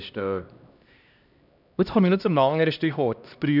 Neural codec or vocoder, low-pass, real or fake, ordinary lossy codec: codec, 16 kHz, 1 kbps, X-Codec, HuBERT features, trained on LibriSpeech; 5.4 kHz; fake; none